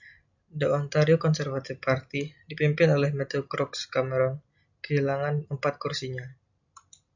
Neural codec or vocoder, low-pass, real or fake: none; 7.2 kHz; real